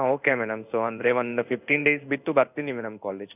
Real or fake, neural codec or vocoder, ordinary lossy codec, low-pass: fake; codec, 16 kHz in and 24 kHz out, 1 kbps, XY-Tokenizer; none; 3.6 kHz